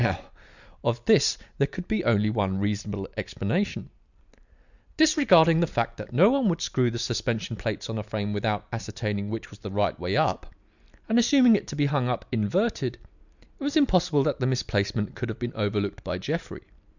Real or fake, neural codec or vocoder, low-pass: real; none; 7.2 kHz